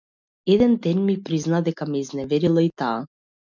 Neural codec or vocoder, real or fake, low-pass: none; real; 7.2 kHz